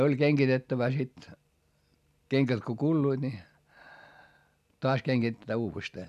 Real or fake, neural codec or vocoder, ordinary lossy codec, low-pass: real; none; none; 14.4 kHz